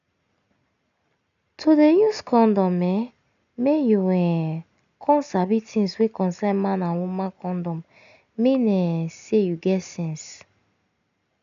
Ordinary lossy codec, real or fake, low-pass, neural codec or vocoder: none; real; 7.2 kHz; none